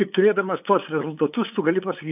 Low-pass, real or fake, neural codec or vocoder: 3.6 kHz; fake; codec, 16 kHz, 8 kbps, FunCodec, trained on LibriTTS, 25 frames a second